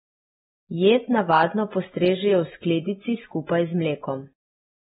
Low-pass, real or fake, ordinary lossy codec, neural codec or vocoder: 19.8 kHz; real; AAC, 16 kbps; none